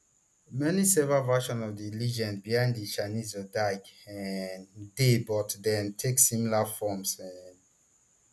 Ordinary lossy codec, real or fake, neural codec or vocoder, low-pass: none; real; none; none